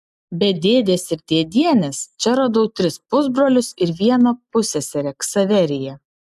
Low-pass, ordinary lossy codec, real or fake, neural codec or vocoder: 14.4 kHz; AAC, 96 kbps; real; none